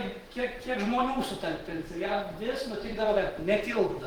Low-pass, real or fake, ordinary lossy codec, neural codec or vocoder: 19.8 kHz; fake; Opus, 16 kbps; vocoder, 48 kHz, 128 mel bands, Vocos